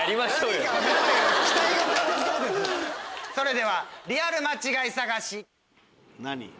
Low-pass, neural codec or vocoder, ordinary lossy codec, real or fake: none; none; none; real